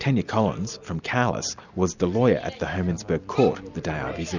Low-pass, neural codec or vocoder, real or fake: 7.2 kHz; none; real